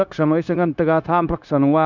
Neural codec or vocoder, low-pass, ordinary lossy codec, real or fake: codec, 16 kHz, 0.9 kbps, LongCat-Audio-Codec; 7.2 kHz; none; fake